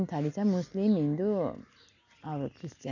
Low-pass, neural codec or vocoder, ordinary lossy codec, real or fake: 7.2 kHz; none; none; real